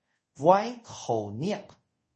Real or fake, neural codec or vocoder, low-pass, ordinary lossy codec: fake; codec, 24 kHz, 0.5 kbps, DualCodec; 10.8 kHz; MP3, 32 kbps